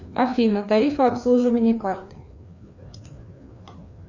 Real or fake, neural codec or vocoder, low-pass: fake; codec, 16 kHz, 2 kbps, FreqCodec, larger model; 7.2 kHz